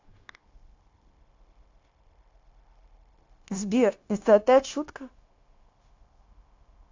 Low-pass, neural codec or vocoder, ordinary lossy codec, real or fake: 7.2 kHz; codec, 16 kHz, 0.9 kbps, LongCat-Audio-Codec; AAC, 48 kbps; fake